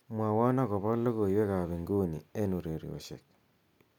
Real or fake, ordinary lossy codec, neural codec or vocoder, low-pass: real; none; none; 19.8 kHz